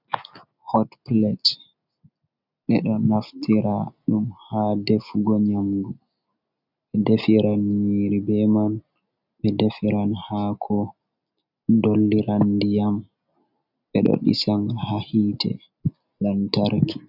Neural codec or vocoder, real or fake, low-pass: none; real; 5.4 kHz